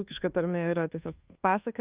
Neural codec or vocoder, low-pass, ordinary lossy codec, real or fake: codec, 24 kHz, 1.2 kbps, DualCodec; 3.6 kHz; Opus, 24 kbps; fake